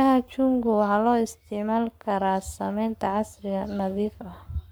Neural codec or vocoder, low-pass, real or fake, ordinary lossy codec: codec, 44.1 kHz, 7.8 kbps, Pupu-Codec; none; fake; none